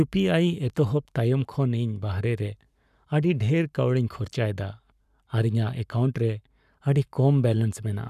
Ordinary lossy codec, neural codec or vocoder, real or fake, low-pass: none; codec, 44.1 kHz, 7.8 kbps, Pupu-Codec; fake; 14.4 kHz